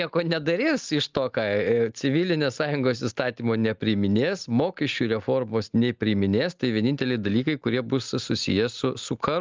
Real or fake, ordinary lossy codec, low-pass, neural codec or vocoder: real; Opus, 32 kbps; 7.2 kHz; none